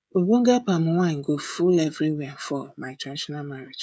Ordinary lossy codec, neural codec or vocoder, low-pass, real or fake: none; codec, 16 kHz, 16 kbps, FreqCodec, smaller model; none; fake